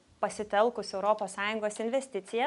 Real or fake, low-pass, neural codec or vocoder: real; 10.8 kHz; none